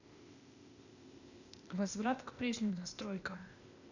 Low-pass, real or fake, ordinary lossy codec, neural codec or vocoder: 7.2 kHz; fake; none; codec, 16 kHz, 0.8 kbps, ZipCodec